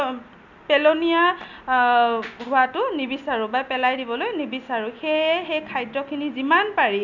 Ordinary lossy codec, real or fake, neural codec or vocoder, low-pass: none; real; none; 7.2 kHz